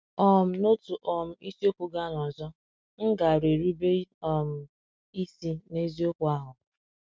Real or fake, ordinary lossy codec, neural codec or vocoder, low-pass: real; none; none; none